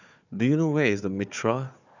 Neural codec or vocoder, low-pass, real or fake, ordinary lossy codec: codec, 16 kHz, 4 kbps, FunCodec, trained on Chinese and English, 50 frames a second; 7.2 kHz; fake; none